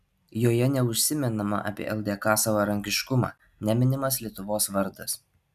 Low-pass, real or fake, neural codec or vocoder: 14.4 kHz; real; none